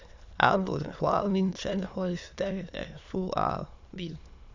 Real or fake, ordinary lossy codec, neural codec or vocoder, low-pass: fake; AAC, 48 kbps; autoencoder, 22.05 kHz, a latent of 192 numbers a frame, VITS, trained on many speakers; 7.2 kHz